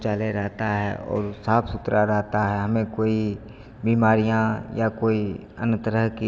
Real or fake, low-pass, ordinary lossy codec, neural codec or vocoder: real; none; none; none